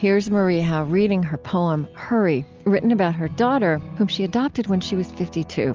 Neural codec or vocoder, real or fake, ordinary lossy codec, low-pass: none; real; Opus, 24 kbps; 7.2 kHz